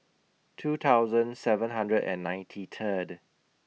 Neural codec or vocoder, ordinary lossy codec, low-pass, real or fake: none; none; none; real